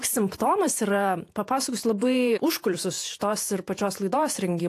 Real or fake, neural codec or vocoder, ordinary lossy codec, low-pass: fake; vocoder, 48 kHz, 128 mel bands, Vocos; AAC, 64 kbps; 14.4 kHz